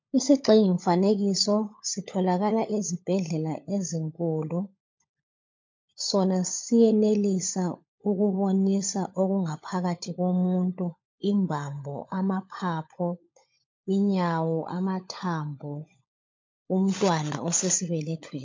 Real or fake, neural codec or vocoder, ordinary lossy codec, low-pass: fake; codec, 16 kHz, 16 kbps, FunCodec, trained on LibriTTS, 50 frames a second; MP3, 48 kbps; 7.2 kHz